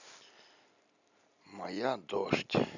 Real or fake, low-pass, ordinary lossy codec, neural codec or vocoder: real; 7.2 kHz; none; none